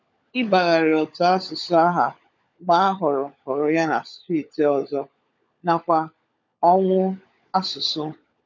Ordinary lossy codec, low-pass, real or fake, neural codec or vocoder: none; 7.2 kHz; fake; codec, 16 kHz, 16 kbps, FunCodec, trained on LibriTTS, 50 frames a second